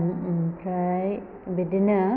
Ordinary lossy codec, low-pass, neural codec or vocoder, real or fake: none; 5.4 kHz; none; real